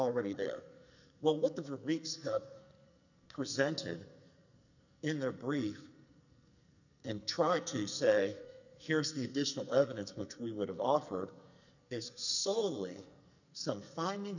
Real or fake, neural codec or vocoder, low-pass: fake; codec, 44.1 kHz, 2.6 kbps, SNAC; 7.2 kHz